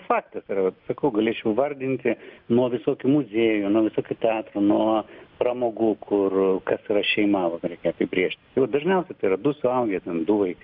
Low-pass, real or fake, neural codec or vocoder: 5.4 kHz; real; none